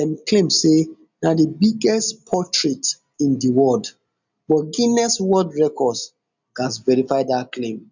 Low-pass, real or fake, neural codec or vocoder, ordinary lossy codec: 7.2 kHz; real; none; none